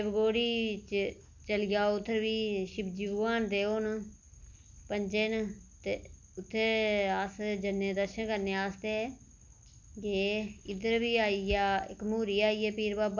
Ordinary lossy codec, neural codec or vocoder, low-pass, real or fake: none; none; 7.2 kHz; real